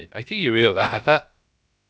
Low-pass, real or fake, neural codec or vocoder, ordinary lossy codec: none; fake; codec, 16 kHz, about 1 kbps, DyCAST, with the encoder's durations; none